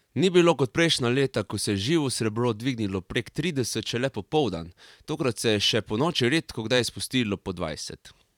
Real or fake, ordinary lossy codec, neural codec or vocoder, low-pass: fake; none; vocoder, 44.1 kHz, 128 mel bands every 512 samples, BigVGAN v2; 19.8 kHz